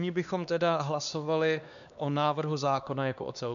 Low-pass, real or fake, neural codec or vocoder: 7.2 kHz; fake; codec, 16 kHz, 2 kbps, X-Codec, HuBERT features, trained on LibriSpeech